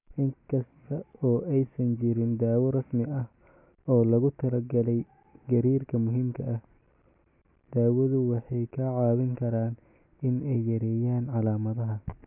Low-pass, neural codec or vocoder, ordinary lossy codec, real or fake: 3.6 kHz; none; none; real